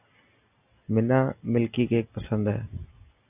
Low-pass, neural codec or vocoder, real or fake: 3.6 kHz; none; real